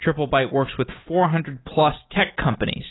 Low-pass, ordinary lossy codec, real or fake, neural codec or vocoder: 7.2 kHz; AAC, 16 kbps; real; none